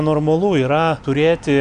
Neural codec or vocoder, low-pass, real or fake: none; 9.9 kHz; real